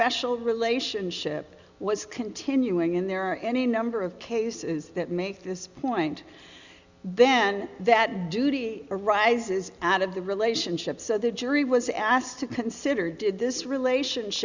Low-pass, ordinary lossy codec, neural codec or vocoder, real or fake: 7.2 kHz; Opus, 64 kbps; none; real